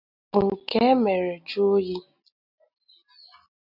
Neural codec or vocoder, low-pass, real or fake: none; 5.4 kHz; real